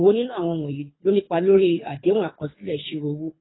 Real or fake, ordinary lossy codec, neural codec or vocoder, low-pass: fake; AAC, 16 kbps; codec, 24 kHz, 3 kbps, HILCodec; 7.2 kHz